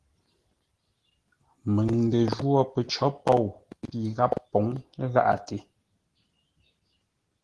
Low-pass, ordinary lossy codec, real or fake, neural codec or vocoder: 10.8 kHz; Opus, 16 kbps; real; none